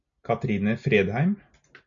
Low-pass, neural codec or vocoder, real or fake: 7.2 kHz; none; real